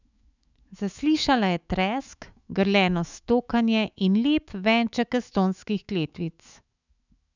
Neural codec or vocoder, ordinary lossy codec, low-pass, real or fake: autoencoder, 48 kHz, 128 numbers a frame, DAC-VAE, trained on Japanese speech; none; 7.2 kHz; fake